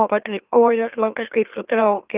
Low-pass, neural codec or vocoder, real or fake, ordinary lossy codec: 3.6 kHz; autoencoder, 44.1 kHz, a latent of 192 numbers a frame, MeloTTS; fake; Opus, 32 kbps